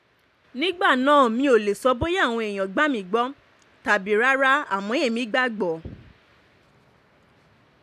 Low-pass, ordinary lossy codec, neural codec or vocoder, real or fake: 14.4 kHz; AAC, 96 kbps; none; real